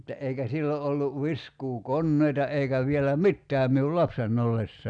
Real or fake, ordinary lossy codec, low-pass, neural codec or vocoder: real; none; 9.9 kHz; none